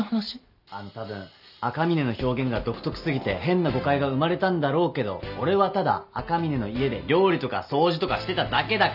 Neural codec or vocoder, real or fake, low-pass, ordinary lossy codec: none; real; 5.4 kHz; none